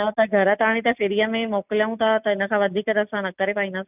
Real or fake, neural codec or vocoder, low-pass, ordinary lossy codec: real; none; 3.6 kHz; none